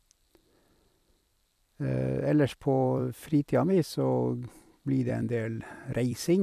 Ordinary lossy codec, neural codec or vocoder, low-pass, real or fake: none; vocoder, 44.1 kHz, 128 mel bands every 256 samples, BigVGAN v2; 14.4 kHz; fake